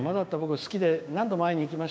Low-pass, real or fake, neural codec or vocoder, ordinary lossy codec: none; fake; codec, 16 kHz, 6 kbps, DAC; none